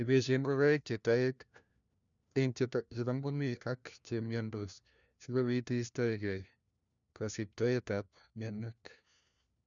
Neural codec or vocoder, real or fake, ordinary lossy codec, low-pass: codec, 16 kHz, 1 kbps, FunCodec, trained on LibriTTS, 50 frames a second; fake; none; 7.2 kHz